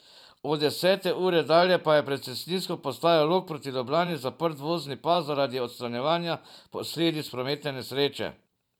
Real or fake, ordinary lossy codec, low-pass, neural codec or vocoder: fake; none; 19.8 kHz; vocoder, 44.1 kHz, 128 mel bands every 512 samples, BigVGAN v2